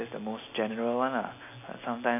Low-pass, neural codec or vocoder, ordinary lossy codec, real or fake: 3.6 kHz; none; none; real